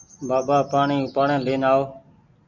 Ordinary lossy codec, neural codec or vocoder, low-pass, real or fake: MP3, 64 kbps; none; 7.2 kHz; real